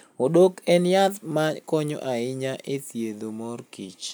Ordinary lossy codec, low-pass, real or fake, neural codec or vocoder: none; none; real; none